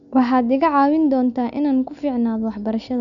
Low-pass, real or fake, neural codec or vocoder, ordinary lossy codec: 7.2 kHz; real; none; none